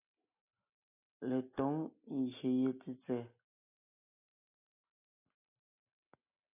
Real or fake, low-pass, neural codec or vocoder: real; 3.6 kHz; none